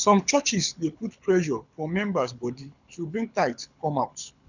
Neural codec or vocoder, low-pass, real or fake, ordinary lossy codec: codec, 24 kHz, 6 kbps, HILCodec; 7.2 kHz; fake; none